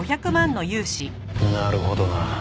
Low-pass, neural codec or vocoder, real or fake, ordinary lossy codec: none; none; real; none